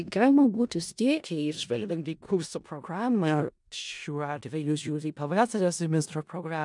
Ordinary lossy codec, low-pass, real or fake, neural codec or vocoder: MP3, 96 kbps; 10.8 kHz; fake; codec, 16 kHz in and 24 kHz out, 0.4 kbps, LongCat-Audio-Codec, four codebook decoder